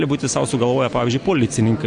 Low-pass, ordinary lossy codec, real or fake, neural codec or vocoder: 9.9 kHz; AAC, 64 kbps; real; none